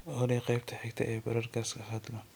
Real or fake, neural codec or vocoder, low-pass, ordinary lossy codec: real; none; none; none